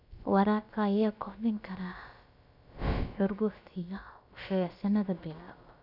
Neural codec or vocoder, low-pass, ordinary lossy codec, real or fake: codec, 16 kHz, about 1 kbps, DyCAST, with the encoder's durations; 5.4 kHz; none; fake